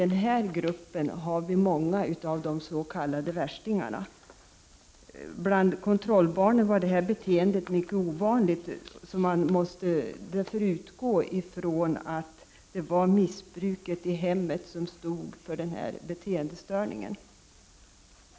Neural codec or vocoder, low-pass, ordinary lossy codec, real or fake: none; none; none; real